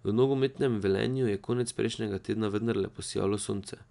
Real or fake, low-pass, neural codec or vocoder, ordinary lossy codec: real; 10.8 kHz; none; none